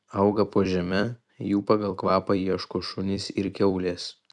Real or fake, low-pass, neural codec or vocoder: fake; 10.8 kHz; vocoder, 44.1 kHz, 128 mel bands every 256 samples, BigVGAN v2